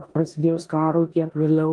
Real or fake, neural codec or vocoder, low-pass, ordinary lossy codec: fake; codec, 16 kHz in and 24 kHz out, 0.9 kbps, LongCat-Audio-Codec, four codebook decoder; 10.8 kHz; Opus, 24 kbps